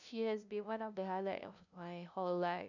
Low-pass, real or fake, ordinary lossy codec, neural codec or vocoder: 7.2 kHz; fake; none; codec, 16 kHz, 0.5 kbps, FunCodec, trained on LibriTTS, 25 frames a second